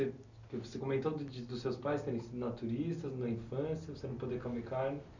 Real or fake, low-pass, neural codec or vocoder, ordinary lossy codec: real; 7.2 kHz; none; none